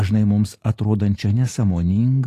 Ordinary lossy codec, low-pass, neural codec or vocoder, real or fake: AAC, 48 kbps; 14.4 kHz; none; real